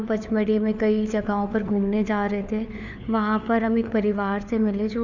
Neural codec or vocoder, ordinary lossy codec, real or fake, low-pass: codec, 16 kHz, 4 kbps, FunCodec, trained on LibriTTS, 50 frames a second; none; fake; 7.2 kHz